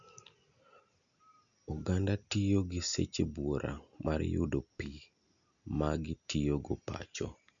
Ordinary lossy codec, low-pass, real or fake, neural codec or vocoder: MP3, 96 kbps; 7.2 kHz; real; none